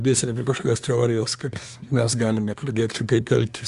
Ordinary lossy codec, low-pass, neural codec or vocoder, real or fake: Opus, 64 kbps; 10.8 kHz; codec, 24 kHz, 1 kbps, SNAC; fake